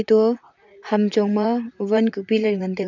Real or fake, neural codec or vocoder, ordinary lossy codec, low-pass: fake; vocoder, 44.1 kHz, 128 mel bands, Pupu-Vocoder; none; 7.2 kHz